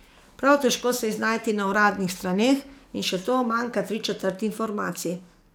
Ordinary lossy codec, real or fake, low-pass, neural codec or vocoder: none; fake; none; codec, 44.1 kHz, 7.8 kbps, DAC